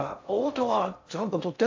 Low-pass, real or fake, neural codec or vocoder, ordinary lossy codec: 7.2 kHz; fake; codec, 16 kHz in and 24 kHz out, 0.6 kbps, FocalCodec, streaming, 2048 codes; AAC, 48 kbps